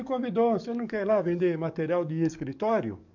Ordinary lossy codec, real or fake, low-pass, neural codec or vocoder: none; fake; 7.2 kHz; codec, 44.1 kHz, 7.8 kbps, DAC